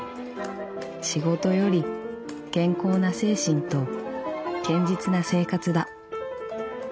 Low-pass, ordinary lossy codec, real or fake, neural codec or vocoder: none; none; real; none